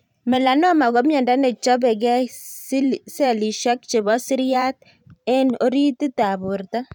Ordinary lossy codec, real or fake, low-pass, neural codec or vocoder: none; fake; 19.8 kHz; vocoder, 44.1 kHz, 128 mel bands every 512 samples, BigVGAN v2